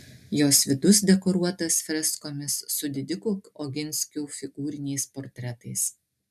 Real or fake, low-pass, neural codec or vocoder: real; 14.4 kHz; none